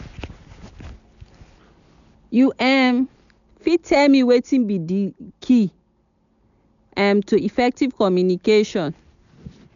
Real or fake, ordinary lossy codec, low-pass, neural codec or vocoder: real; none; 7.2 kHz; none